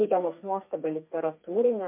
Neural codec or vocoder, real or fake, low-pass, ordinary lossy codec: codec, 32 kHz, 1.9 kbps, SNAC; fake; 3.6 kHz; MP3, 32 kbps